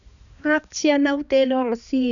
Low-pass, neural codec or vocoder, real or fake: 7.2 kHz; codec, 16 kHz, 2 kbps, X-Codec, HuBERT features, trained on balanced general audio; fake